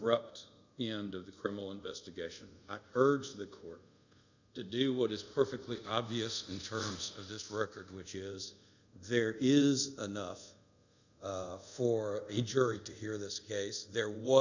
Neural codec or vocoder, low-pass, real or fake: codec, 24 kHz, 0.5 kbps, DualCodec; 7.2 kHz; fake